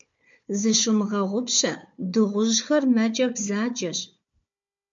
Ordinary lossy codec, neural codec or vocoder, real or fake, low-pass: MP3, 48 kbps; codec, 16 kHz, 4 kbps, FunCodec, trained on Chinese and English, 50 frames a second; fake; 7.2 kHz